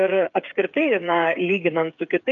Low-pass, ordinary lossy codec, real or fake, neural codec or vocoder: 7.2 kHz; MP3, 96 kbps; fake; codec, 16 kHz, 8 kbps, FreqCodec, smaller model